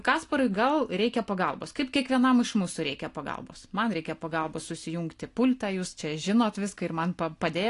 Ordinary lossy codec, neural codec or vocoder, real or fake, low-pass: AAC, 48 kbps; none; real; 10.8 kHz